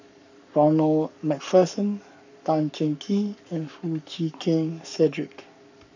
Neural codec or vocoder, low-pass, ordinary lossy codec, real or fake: codec, 44.1 kHz, 7.8 kbps, Pupu-Codec; 7.2 kHz; none; fake